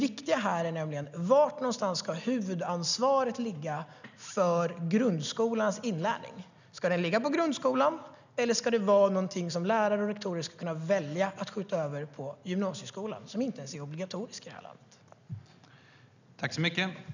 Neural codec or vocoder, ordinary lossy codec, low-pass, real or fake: none; none; 7.2 kHz; real